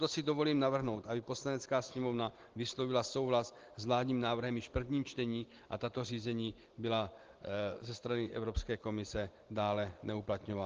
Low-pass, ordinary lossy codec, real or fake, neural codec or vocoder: 7.2 kHz; Opus, 16 kbps; real; none